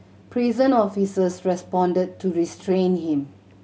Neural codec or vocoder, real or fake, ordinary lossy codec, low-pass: none; real; none; none